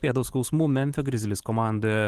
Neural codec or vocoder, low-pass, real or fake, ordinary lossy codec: vocoder, 44.1 kHz, 128 mel bands every 512 samples, BigVGAN v2; 14.4 kHz; fake; Opus, 16 kbps